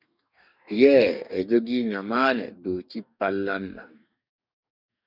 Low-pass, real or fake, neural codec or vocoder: 5.4 kHz; fake; codec, 44.1 kHz, 2.6 kbps, DAC